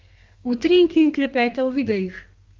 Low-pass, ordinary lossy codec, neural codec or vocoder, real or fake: 7.2 kHz; Opus, 32 kbps; codec, 32 kHz, 1.9 kbps, SNAC; fake